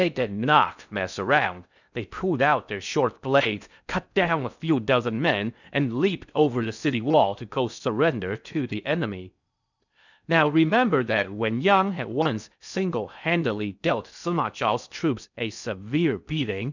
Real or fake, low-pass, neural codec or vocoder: fake; 7.2 kHz; codec, 16 kHz in and 24 kHz out, 0.6 kbps, FocalCodec, streaming, 4096 codes